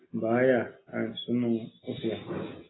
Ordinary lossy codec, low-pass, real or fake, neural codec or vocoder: AAC, 16 kbps; 7.2 kHz; real; none